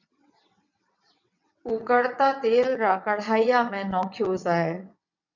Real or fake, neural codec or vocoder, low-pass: fake; vocoder, 22.05 kHz, 80 mel bands, WaveNeXt; 7.2 kHz